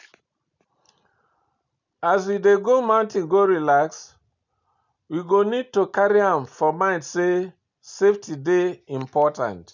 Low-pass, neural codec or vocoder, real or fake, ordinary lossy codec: 7.2 kHz; none; real; none